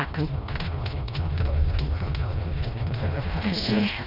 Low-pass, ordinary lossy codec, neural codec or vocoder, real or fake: 5.4 kHz; none; codec, 16 kHz, 1 kbps, FreqCodec, smaller model; fake